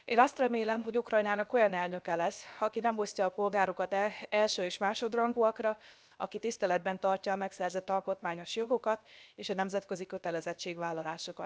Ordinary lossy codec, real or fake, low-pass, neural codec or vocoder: none; fake; none; codec, 16 kHz, about 1 kbps, DyCAST, with the encoder's durations